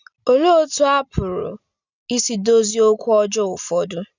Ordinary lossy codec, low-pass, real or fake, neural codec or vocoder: none; 7.2 kHz; real; none